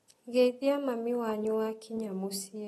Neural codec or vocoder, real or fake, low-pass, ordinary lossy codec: none; real; 19.8 kHz; AAC, 32 kbps